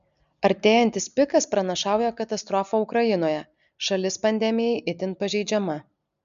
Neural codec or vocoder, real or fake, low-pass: none; real; 7.2 kHz